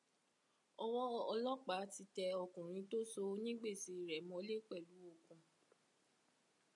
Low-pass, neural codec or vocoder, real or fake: 10.8 kHz; none; real